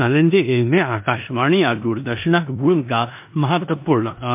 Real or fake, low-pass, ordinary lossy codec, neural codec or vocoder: fake; 3.6 kHz; MP3, 32 kbps; codec, 16 kHz in and 24 kHz out, 0.9 kbps, LongCat-Audio-Codec, four codebook decoder